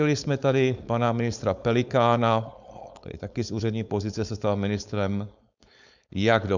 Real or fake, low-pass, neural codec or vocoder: fake; 7.2 kHz; codec, 16 kHz, 4.8 kbps, FACodec